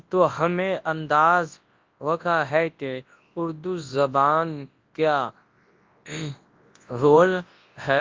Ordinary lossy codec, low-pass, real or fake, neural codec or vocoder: Opus, 32 kbps; 7.2 kHz; fake; codec, 24 kHz, 0.9 kbps, WavTokenizer, large speech release